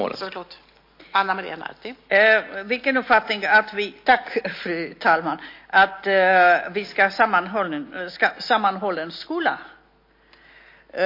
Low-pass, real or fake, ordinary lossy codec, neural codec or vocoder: 5.4 kHz; real; MP3, 32 kbps; none